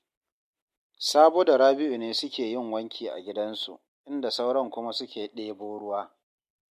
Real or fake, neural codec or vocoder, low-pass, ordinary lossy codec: real; none; 14.4 kHz; MP3, 64 kbps